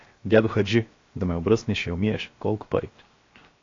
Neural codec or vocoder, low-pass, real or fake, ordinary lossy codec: codec, 16 kHz, 0.7 kbps, FocalCodec; 7.2 kHz; fake; AAC, 32 kbps